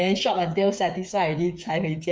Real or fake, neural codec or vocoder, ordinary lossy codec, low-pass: fake; codec, 16 kHz, 8 kbps, FreqCodec, smaller model; none; none